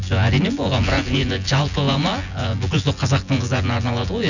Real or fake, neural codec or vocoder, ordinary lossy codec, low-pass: fake; vocoder, 24 kHz, 100 mel bands, Vocos; none; 7.2 kHz